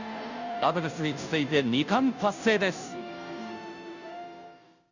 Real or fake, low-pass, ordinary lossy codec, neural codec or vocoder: fake; 7.2 kHz; none; codec, 16 kHz, 0.5 kbps, FunCodec, trained on Chinese and English, 25 frames a second